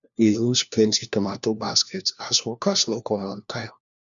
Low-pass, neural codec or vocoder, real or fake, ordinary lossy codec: 7.2 kHz; codec, 16 kHz, 1 kbps, FunCodec, trained on LibriTTS, 50 frames a second; fake; none